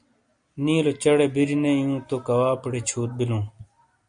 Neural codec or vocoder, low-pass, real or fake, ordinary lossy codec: none; 9.9 kHz; real; MP3, 64 kbps